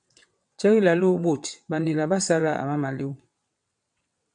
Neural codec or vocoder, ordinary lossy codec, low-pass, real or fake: vocoder, 22.05 kHz, 80 mel bands, WaveNeXt; MP3, 96 kbps; 9.9 kHz; fake